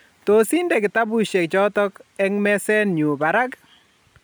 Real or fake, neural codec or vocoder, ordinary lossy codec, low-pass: real; none; none; none